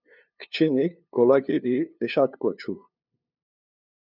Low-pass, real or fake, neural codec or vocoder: 5.4 kHz; fake; codec, 16 kHz, 2 kbps, FunCodec, trained on LibriTTS, 25 frames a second